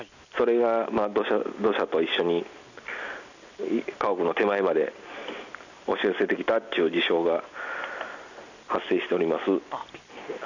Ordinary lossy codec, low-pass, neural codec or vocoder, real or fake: none; 7.2 kHz; none; real